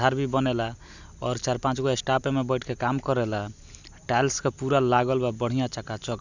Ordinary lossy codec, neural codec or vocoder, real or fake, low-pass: none; none; real; 7.2 kHz